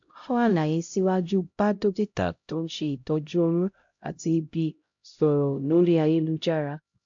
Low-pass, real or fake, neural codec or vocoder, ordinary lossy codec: 7.2 kHz; fake; codec, 16 kHz, 0.5 kbps, X-Codec, HuBERT features, trained on LibriSpeech; MP3, 48 kbps